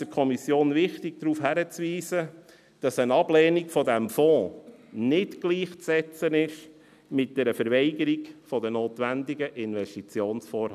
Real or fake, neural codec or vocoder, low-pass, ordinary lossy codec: real; none; 14.4 kHz; none